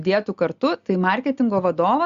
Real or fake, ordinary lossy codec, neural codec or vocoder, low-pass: real; Opus, 64 kbps; none; 7.2 kHz